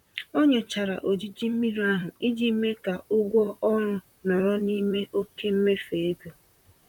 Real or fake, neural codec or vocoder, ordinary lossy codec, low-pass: fake; vocoder, 44.1 kHz, 128 mel bands, Pupu-Vocoder; none; 19.8 kHz